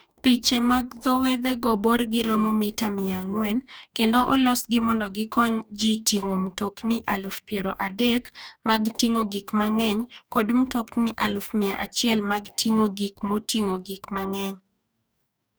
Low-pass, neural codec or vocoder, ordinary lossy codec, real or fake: none; codec, 44.1 kHz, 2.6 kbps, DAC; none; fake